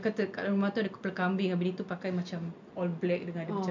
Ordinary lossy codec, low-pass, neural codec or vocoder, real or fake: MP3, 64 kbps; 7.2 kHz; none; real